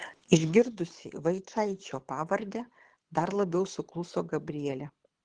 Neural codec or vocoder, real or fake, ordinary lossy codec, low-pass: codec, 24 kHz, 6 kbps, HILCodec; fake; Opus, 16 kbps; 9.9 kHz